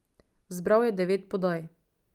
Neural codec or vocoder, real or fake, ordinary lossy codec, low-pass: none; real; Opus, 32 kbps; 19.8 kHz